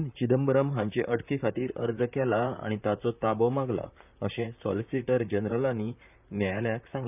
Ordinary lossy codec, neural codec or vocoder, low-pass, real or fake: none; vocoder, 44.1 kHz, 128 mel bands, Pupu-Vocoder; 3.6 kHz; fake